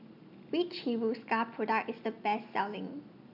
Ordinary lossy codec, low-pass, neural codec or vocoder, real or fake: none; 5.4 kHz; none; real